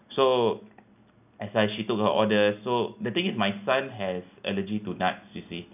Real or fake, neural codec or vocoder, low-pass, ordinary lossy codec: real; none; 3.6 kHz; none